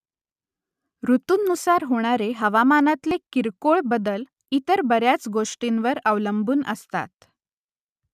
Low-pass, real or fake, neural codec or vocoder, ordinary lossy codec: 14.4 kHz; real; none; none